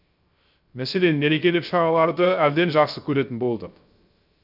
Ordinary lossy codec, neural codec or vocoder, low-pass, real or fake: none; codec, 16 kHz, 0.3 kbps, FocalCodec; 5.4 kHz; fake